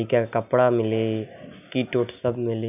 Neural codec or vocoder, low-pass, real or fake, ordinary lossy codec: none; 3.6 kHz; real; none